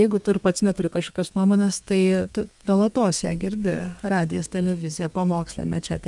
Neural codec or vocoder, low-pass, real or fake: codec, 32 kHz, 1.9 kbps, SNAC; 10.8 kHz; fake